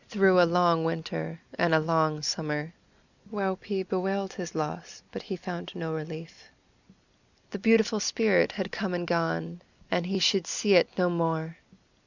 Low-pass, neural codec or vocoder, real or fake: 7.2 kHz; none; real